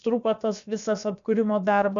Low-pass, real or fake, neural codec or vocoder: 7.2 kHz; fake; codec, 16 kHz, about 1 kbps, DyCAST, with the encoder's durations